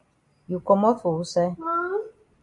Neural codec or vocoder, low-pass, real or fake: none; 10.8 kHz; real